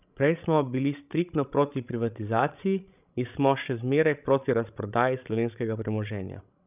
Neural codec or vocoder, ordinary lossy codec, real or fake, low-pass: codec, 16 kHz, 16 kbps, FreqCodec, larger model; none; fake; 3.6 kHz